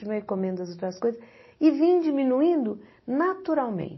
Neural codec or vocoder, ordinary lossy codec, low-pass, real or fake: none; MP3, 24 kbps; 7.2 kHz; real